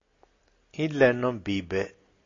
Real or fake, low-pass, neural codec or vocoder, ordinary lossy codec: real; 7.2 kHz; none; AAC, 32 kbps